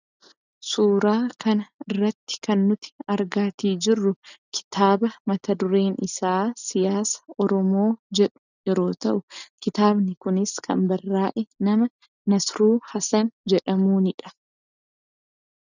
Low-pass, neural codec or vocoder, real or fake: 7.2 kHz; none; real